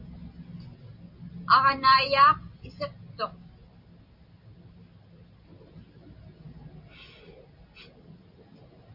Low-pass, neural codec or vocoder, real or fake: 5.4 kHz; none; real